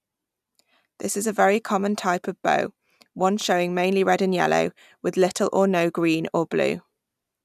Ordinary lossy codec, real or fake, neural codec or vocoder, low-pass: none; real; none; 14.4 kHz